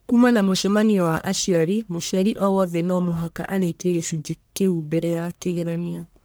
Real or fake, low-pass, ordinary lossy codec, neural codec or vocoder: fake; none; none; codec, 44.1 kHz, 1.7 kbps, Pupu-Codec